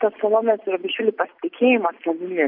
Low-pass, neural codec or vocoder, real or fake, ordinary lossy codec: 5.4 kHz; none; real; MP3, 48 kbps